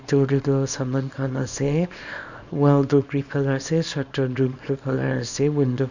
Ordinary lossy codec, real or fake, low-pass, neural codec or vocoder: none; fake; 7.2 kHz; codec, 24 kHz, 0.9 kbps, WavTokenizer, small release